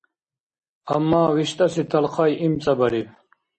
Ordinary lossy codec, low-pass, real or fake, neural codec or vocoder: MP3, 32 kbps; 9.9 kHz; real; none